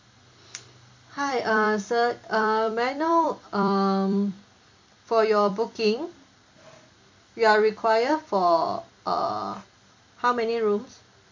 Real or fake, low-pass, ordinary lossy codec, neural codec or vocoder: fake; 7.2 kHz; MP3, 48 kbps; vocoder, 44.1 kHz, 128 mel bands every 256 samples, BigVGAN v2